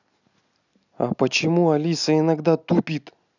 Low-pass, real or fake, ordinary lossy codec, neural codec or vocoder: 7.2 kHz; real; none; none